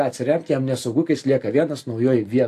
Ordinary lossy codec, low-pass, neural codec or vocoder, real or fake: AAC, 64 kbps; 14.4 kHz; none; real